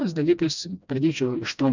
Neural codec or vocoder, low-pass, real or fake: codec, 16 kHz, 1 kbps, FreqCodec, smaller model; 7.2 kHz; fake